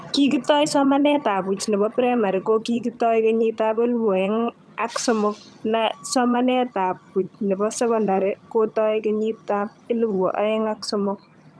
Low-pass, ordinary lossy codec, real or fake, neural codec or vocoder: none; none; fake; vocoder, 22.05 kHz, 80 mel bands, HiFi-GAN